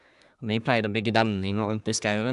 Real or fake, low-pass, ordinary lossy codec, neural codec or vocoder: fake; 10.8 kHz; AAC, 96 kbps; codec, 24 kHz, 1 kbps, SNAC